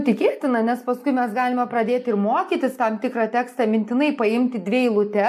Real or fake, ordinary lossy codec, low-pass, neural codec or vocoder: real; MP3, 64 kbps; 14.4 kHz; none